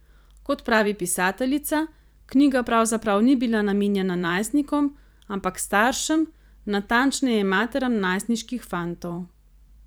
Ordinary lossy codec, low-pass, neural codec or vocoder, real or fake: none; none; vocoder, 44.1 kHz, 128 mel bands every 512 samples, BigVGAN v2; fake